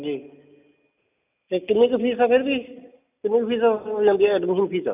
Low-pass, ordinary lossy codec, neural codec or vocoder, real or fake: 3.6 kHz; none; none; real